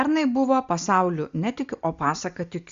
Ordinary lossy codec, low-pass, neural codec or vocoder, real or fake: Opus, 64 kbps; 7.2 kHz; none; real